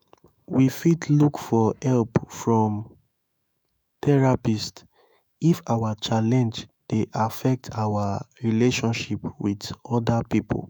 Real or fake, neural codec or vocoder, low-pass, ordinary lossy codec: fake; autoencoder, 48 kHz, 128 numbers a frame, DAC-VAE, trained on Japanese speech; none; none